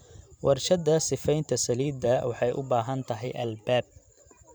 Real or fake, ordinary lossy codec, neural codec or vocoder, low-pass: fake; none; vocoder, 44.1 kHz, 128 mel bands every 512 samples, BigVGAN v2; none